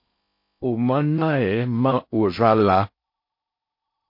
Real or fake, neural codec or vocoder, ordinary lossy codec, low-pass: fake; codec, 16 kHz in and 24 kHz out, 0.6 kbps, FocalCodec, streaming, 4096 codes; MP3, 32 kbps; 5.4 kHz